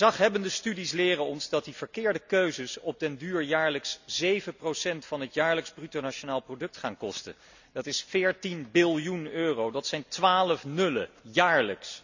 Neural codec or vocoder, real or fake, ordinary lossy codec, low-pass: none; real; none; 7.2 kHz